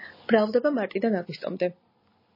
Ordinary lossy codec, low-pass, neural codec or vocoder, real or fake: MP3, 24 kbps; 5.4 kHz; none; real